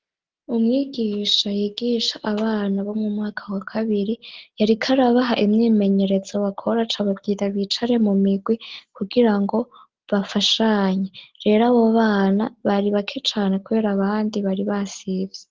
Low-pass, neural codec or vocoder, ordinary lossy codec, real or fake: 7.2 kHz; none; Opus, 16 kbps; real